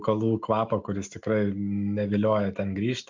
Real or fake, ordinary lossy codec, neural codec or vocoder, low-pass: real; MP3, 64 kbps; none; 7.2 kHz